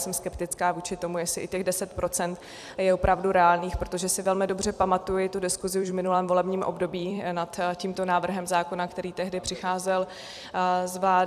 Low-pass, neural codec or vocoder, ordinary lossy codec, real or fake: 14.4 kHz; vocoder, 44.1 kHz, 128 mel bands every 256 samples, BigVGAN v2; AAC, 96 kbps; fake